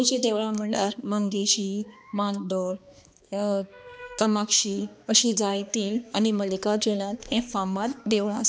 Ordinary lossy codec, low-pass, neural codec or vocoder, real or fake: none; none; codec, 16 kHz, 2 kbps, X-Codec, HuBERT features, trained on balanced general audio; fake